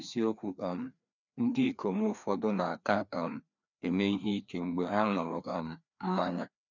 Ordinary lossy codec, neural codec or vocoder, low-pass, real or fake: none; codec, 16 kHz, 2 kbps, FreqCodec, larger model; 7.2 kHz; fake